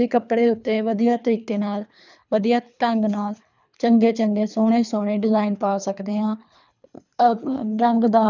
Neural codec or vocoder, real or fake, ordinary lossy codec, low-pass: codec, 24 kHz, 3 kbps, HILCodec; fake; none; 7.2 kHz